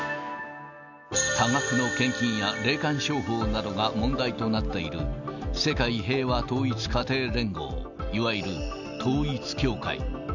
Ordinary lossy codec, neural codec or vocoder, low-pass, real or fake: none; none; 7.2 kHz; real